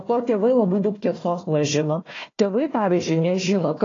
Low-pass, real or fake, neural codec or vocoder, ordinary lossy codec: 7.2 kHz; fake; codec, 16 kHz, 1 kbps, FunCodec, trained on Chinese and English, 50 frames a second; AAC, 32 kbps